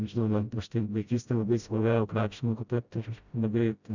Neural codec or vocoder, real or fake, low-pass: codec, 16 kHz, 0.5 kbps, FreqCodec, smaller model; fake; 7.2 kHz